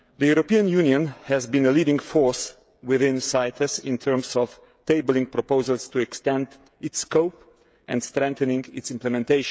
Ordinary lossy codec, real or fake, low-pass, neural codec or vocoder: none; fake; none; codec, 16 kHz, 16 kbps, FreqCodec, smaller model